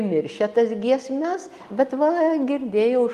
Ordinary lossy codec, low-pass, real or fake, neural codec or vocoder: Opus, 32 kbps; 14.4 kHz; real; none